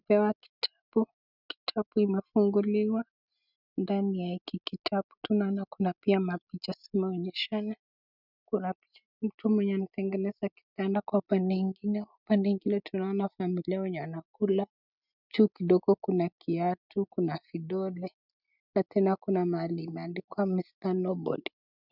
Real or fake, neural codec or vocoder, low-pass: real; none; 5.4 kHz